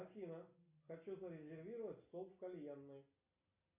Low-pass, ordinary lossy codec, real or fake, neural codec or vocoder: 3.6 kHz; AAC, 32 kbps; real; none